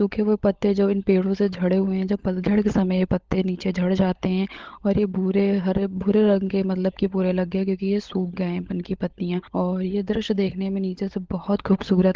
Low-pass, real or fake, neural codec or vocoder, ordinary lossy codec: 7.2 kHz; fake; codec, 16 kHz, 16 kbps, FunCodec, trained on LibriTTS, 50 frames a second; Opus, 16 kbps